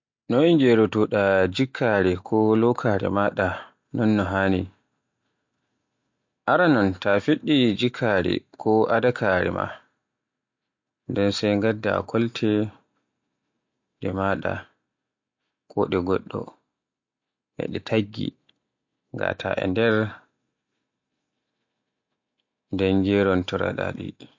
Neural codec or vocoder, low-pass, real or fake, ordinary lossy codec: none; 7.2 kHz; real; MP3, 48 kbps